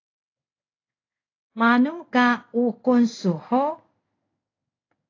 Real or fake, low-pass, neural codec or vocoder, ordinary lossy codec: fake; 7.2 kHz; codec, 16 kHz in and 24 kHz out, 1 kbps, XY-Tokenizer; AAC, 32 kbps